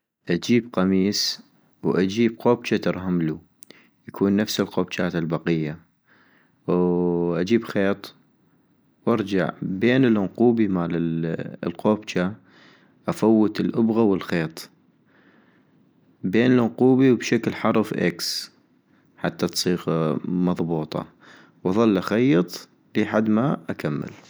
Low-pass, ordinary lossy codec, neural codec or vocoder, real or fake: none; none; none; real